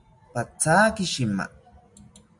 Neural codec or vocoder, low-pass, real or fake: none; 10.8 kHz; real